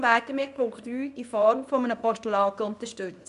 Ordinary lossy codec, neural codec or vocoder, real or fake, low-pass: none; codec, 24 kHz, 0.9 kbps, WavTokenizer, small release; fake; 10.8 kHz